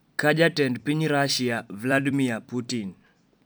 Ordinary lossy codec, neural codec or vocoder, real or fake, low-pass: none; vocoder, 44.1 kHz, 128 mel bands every 256 samples, BigVGAN v2; fake; none